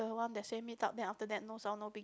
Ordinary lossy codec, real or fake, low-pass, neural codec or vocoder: none; real; none; none